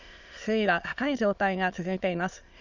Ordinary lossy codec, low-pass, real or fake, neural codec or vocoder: none; 7.2 kHz; fake; autoencoder, 22.05 kHz, a latent of 192 numbers a frame, VITS, trained on many speakers